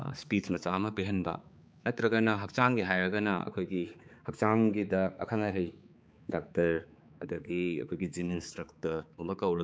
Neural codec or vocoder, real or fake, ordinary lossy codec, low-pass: codec, 16 kHz, 4 kbps, X-Codec, HuBERT features, trained on balanced general audio; fake; none; none